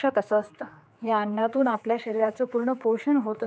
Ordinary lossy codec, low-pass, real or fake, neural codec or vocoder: none; none; fake; codec, 16 kHz, 4 kbps, X-Codec, HuBERT features, trained on general audio